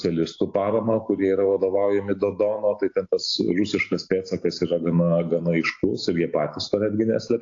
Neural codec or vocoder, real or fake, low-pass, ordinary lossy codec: none; real; 7.2 kHz; AAC, 48 kbps